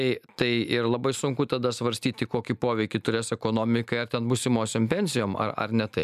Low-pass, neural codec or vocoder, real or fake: 14.4 kHz; none; real